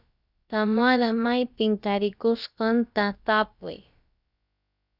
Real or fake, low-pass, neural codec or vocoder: fake; 5.4 kHz; codec, 16 kHz, about 1 kbps, DyCAST, with the encoder's durations